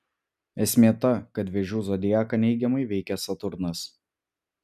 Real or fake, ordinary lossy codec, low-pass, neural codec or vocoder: real; MP3, 96 kbps; 14.4 kHz; none